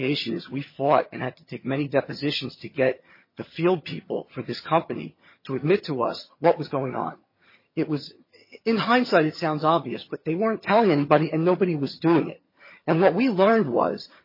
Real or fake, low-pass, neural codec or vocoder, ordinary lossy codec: fake; 5.4 kHz; vocoder, 22.05 kHz, 80 mel bands, HiFi-GAN; MP3, 24 kbps